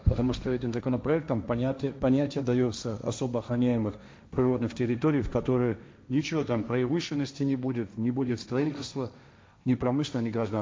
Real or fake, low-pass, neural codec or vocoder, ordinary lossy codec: fake; none; codec, 16 kHz, 1.1 kbps, Voila-Tokenizer; none